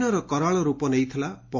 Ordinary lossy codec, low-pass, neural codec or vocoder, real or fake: MP3, 32 kbps; 7.2 kHz; none; real